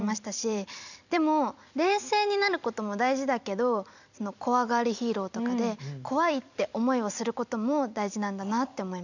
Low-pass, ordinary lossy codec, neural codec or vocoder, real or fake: 7.2 kHz; none; vocoder, 44.1 kHz, 128 mel bands every 256 samples, BigVGAN v2; fake